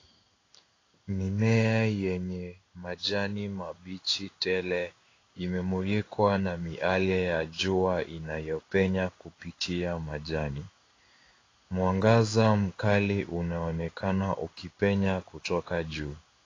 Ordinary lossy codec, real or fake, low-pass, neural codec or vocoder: AAC, 32 kbps; fake; 7.2 kHz; codec, 16 kHz in and 24 kHz out, 1 kbps, XY-Tokenizer